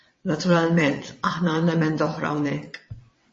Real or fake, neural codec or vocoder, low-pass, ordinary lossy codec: fake; vocoder, 22.05 kHz, 80 mel bands, WaveNeXt; 9.9 kHz; MP3, 32 kbps